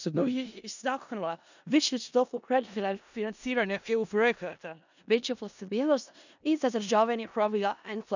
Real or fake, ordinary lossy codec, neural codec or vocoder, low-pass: fake; none; codec, 16 kHz in and 24 kHz out, 0.4 kbps, LongCat-Audio-Codec, four codebook decoder; 7.2 kHz